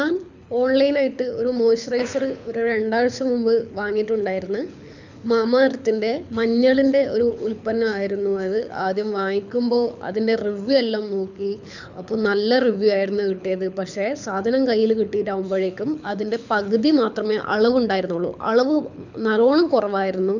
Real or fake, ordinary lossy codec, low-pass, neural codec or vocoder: fake; none; 7.2 kHz; codec, 24 kHz, 6 kbps, HILCodec